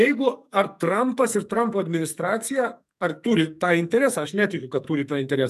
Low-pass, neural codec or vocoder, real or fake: 14.4 kHz; codec, 44.1 kHz, 2.6 kbps, SNAC; fake